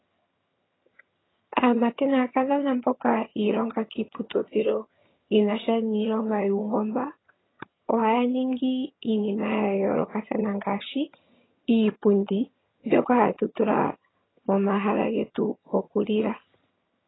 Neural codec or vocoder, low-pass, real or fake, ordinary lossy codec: vocoder, 22.05 kHz, 80 mel bands, HiFi-GAN; 7.2 kHz; fake; AAC, 16 kbps